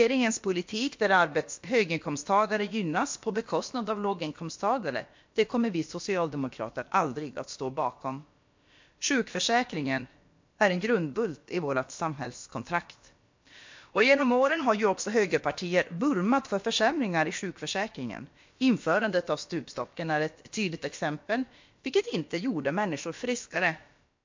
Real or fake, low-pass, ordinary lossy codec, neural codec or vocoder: fake; 7.2 kHz; MP3, 48 kbps; codec, 16 kHz, about 1 kbps, DyCAST, with the encoder's durations